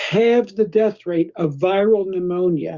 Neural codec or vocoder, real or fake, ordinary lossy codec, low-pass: codec, 16 kHz, 6 kbps, DAC; fake; Opus, 64 kbps; 7.2 kHz